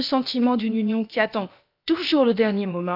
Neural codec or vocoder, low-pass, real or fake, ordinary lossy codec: codec, 16 kHz, about 1 kbps, DyCAST, with the encoder's durations; 5.4 kHz; fake; none